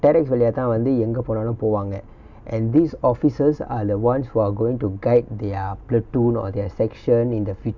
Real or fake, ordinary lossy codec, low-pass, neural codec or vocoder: real; none; 7.2 kHz; none